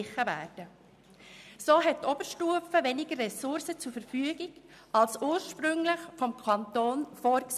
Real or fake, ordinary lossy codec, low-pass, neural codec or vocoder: real; none; 14.4 kHz; none